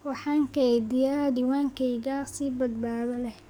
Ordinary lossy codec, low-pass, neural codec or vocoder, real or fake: none; none; codec, 44.1 kHz, 7.8 kbps, DAC; fake